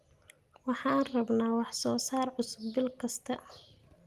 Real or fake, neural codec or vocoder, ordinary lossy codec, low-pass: real; none; Opus, 24 kbps; 14.4 kHz